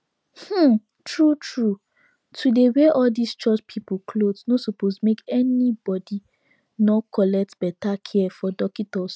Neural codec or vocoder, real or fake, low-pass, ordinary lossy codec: none; real; none; none